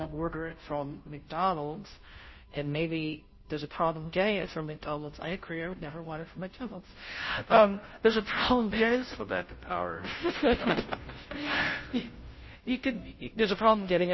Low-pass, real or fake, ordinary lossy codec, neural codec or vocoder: 7.2 kHz; fake; MP3, 24 kbps; codec, 16 kHz, 0.5 kbps, FunCodec, trained on Chinese and English, 25 frames a second